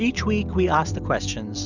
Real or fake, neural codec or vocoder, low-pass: real; none; 7.2 kHz